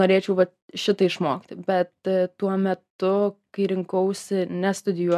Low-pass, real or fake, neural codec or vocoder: 14.4 kHz; real; none